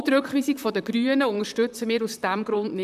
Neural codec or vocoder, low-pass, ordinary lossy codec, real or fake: vocoder, 44.1 kHz, 128 mel bands, Pupu-Vocoder; 14.4 kHz; none; fake